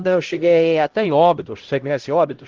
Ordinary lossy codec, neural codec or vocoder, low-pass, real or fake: Opus, 16 kbps; codec, 16 kHz, 0.5 kbps, X-Codec, HuBERT features, trained on LibriSpeech; 7.2 kHz; fake